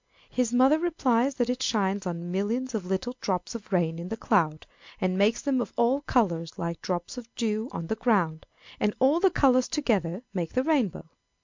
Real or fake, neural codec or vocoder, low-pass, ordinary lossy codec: real; none; 7.2 kHz; MP3, 64 kbps